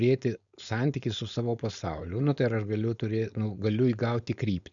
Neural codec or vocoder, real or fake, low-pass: codec, 16 kHz, 4.8 kbps, FACodec; fake; 7.2 kHz